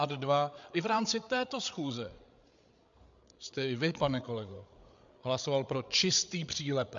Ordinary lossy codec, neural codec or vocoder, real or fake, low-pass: MP3, 64 kbps; codec, 16 kHz, 8 kbps, FreqCodec, larger model; fake; 7.2 kHz